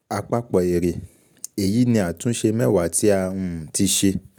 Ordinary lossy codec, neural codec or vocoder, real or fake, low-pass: none; none; real; none